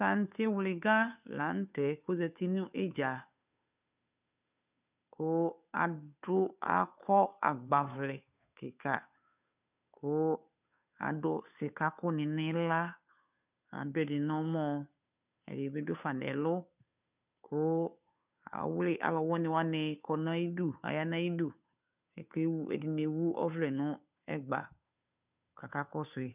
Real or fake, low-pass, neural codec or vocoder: fake; 3.6 kHz; codec, 16 kHz, 2 kbps, FunCodec, trained on Chinese and English, 25 frames a second